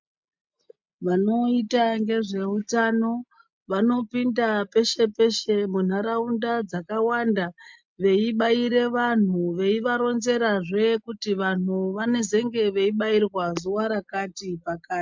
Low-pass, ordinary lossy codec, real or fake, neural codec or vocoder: 7.2 kHz; MP3, 64 kbps; real; none